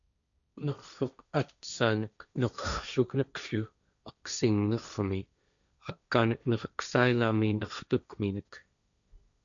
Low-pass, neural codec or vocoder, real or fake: 7.2 kHz; codec, 16 kHz, 1.1 kbps, Voila-Tokenizer; fake